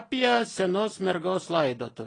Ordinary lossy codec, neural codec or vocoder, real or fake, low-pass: AAC, 32 kbps; none; real; 9.9 kHz